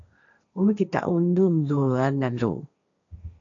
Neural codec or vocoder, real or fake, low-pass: codec, 16 kHz, 1.1 kbps, Voila-Tokenizer; fake; 7.2 kHz